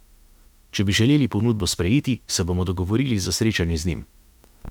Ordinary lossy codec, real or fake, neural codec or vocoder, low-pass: none; fake; autoencoder, 48 kHz, 32 numbers a frame, DAC-VAE, trained on Japanese speech; 19.8 kHz